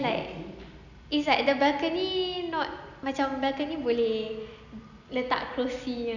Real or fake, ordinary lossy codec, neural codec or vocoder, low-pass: real; none; none; 7.2 kHz